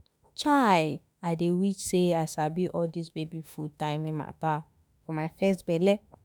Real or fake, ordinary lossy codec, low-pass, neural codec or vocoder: fake; none; none; autoencoder, 48 kHz, 32 numbers a frame, DAC-VAE, trained on Japanese speech